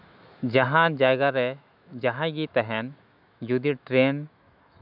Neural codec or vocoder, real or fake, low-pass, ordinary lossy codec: autoencoder, 48 kHz, 128 numbers a frame, DAC-VAE, trained on Japanese speech; fake; 5.4 kHz; none